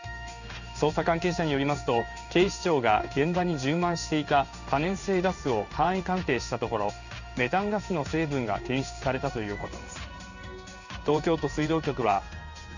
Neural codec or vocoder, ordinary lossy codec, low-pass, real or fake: codec, 16 kHz in and 24 kHz out, 1 kbps, XY-Tokenizer; none; 7.2 kHz; fake